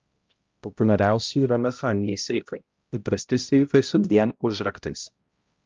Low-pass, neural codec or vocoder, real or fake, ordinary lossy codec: 7.2 kHz; codec, 16 kHz, 0.5 kbps, X-Codec, HuBERT features, trained on balanced general audio; fake; Opus, 24 kbps